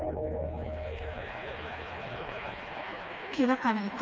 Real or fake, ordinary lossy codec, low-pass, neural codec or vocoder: fake; none; none; codec, 16 kHz, 2 kbps, FreqCodec, smaller model